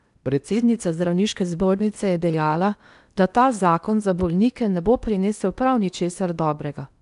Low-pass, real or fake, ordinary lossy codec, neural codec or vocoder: 10.8 kHz; fake; none; codec, 16 kHz in and 24 kHz out, 0.8 kbps, FocalCodec, streaming, 65536 codes